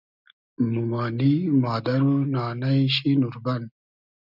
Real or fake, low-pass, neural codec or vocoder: real; 5.4 kHz; none